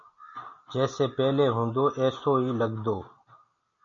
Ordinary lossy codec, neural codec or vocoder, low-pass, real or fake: MP3, 48 kbps; none; 7.2 kHz; real